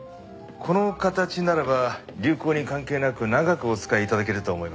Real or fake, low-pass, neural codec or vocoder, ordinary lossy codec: real; none; none; none